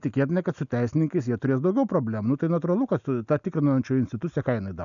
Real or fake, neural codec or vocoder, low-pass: real; none; 7.2 kHz